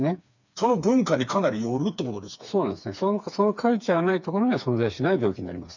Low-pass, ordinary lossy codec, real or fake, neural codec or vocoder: 7.2 kHz; none; fake; codec, 16 kHz, 4 kbps, FreqCodec, smaller model